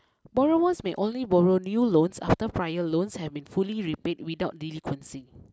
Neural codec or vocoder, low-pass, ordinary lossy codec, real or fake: none; none; none; real